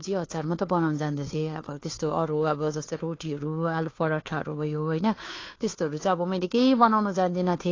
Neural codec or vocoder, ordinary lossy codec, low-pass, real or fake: codec, 16 kHz, 2 kbps, FunCodec, trained on Chinese and English, 25 frames a second; AAC, 32 kbps; 7.2 kHz; fake